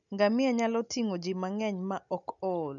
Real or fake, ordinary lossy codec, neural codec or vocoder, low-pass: real; none; none; 7.2 kHz